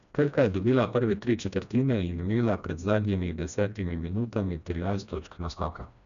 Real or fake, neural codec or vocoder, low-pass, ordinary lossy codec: fake; codec, 16 kHz, 1 kbps, FreqCodec, smaller model; 7.2 kHz; none